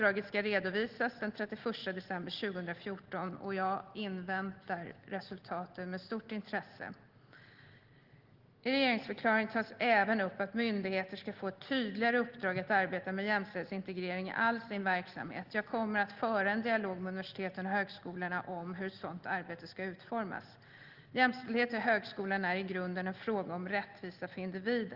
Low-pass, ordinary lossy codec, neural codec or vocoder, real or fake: 5.4 kHz; Opus, 16 kbps; none; real